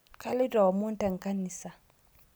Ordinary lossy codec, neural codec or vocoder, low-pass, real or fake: none; none; none; real